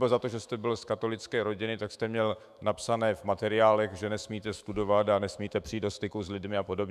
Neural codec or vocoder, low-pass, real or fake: autoencoder, 48 kHz, 128 numbers a frame, DAC-VAE, trained on Japanese speech; 14.4 kHz; fake